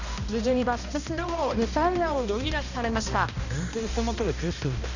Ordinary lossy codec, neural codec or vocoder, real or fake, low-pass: none; codec, 16 kHz, 1 kbps, X-Codec, HuBERT features, trained on balanced general audio; fake; 7.2 kHz